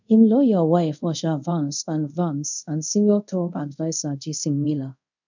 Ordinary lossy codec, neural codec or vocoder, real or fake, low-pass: none; codec, 24 kHz, 0.5 kbps, DualCodec; fake; 7.2 kHz